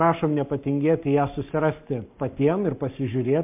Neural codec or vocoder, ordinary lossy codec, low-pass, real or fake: codec, 44.1 kHz, 7.8 kbps, Pupu-Codec; MP3, 32 kbps; 3.6 kHz; fake